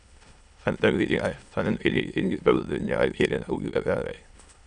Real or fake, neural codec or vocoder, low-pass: fake; autoencoder, 22.05 kHz, a latent of 192 numbers a frame, VITS, trained on many speakers; 9.9 kHz